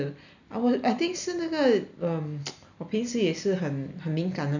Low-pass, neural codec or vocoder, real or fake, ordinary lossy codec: 7.2 kHz; none; real; none